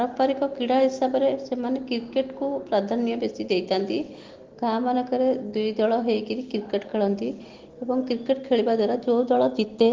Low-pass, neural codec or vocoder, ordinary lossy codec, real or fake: 7.2 kHz; none; Opus, 16 kbps; real